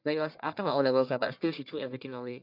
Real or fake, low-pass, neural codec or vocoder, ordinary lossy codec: fake; 5.4 kHz; codec, 44.1 kHz, 1.7 kbps, Pupu-Codec; none